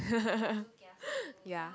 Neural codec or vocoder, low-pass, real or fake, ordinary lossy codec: none; none; real; none